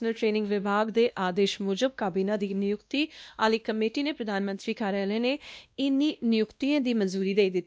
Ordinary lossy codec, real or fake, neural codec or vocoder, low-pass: none; fake; codec, 16 kHz, 1 kbps, X-Codec, WavLM features, trained on Multilingual LibriSpeech; none